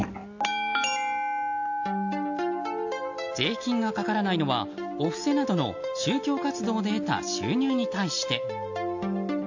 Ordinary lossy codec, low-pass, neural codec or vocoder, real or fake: AAC, 48 kbps; 7.2 kHz; none; real